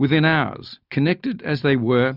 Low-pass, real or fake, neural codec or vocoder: 5.4 kHz; real; none